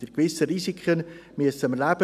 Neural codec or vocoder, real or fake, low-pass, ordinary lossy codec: none; real; 14.4 kHz; none